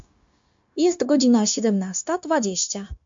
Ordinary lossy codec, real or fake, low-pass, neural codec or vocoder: MP3, 48 kbps; fake; 7.2 kHz; codec, 16 kHz, 0.9 kbps, LongCat-Audio-Codec